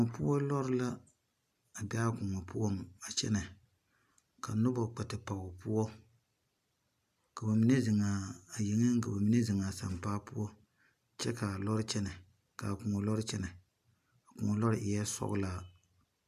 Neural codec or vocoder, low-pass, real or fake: none; 14.4 kHz; real